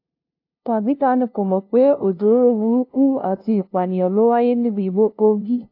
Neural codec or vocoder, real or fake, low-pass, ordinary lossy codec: codec, 16 kHz, 0.5 kbps, FunCodec, trained on LibriTTS, 25 frames a second; fake; 5.4 kHz; AAC, 32 kbps